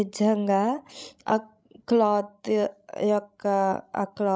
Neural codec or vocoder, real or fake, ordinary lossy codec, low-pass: codec, 16 kHz, 16 kbps, FreqCodec, larger model; fake; none; none